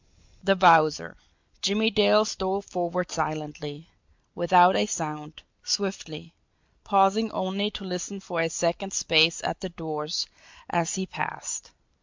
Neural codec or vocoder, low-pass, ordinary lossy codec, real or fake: none; 7.2 kHz; MP3, 64 kbps; real